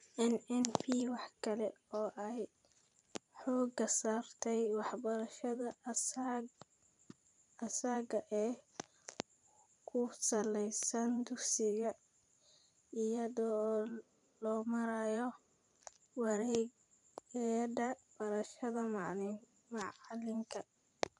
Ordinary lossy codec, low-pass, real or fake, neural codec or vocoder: none; 10.8 kHz; fake; vocoder, 44.1 kHz, 128 mel bands every 512 samples, BigVGAN v2